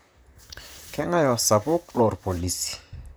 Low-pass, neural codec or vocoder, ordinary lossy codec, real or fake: none; vocoder, 44.1 kHz, 128 mel bands, Pupu-Vocoder; none; fake